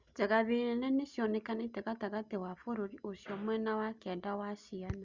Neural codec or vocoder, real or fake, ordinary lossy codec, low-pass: none; real; Opus, 64 kbps; 7.2 kHz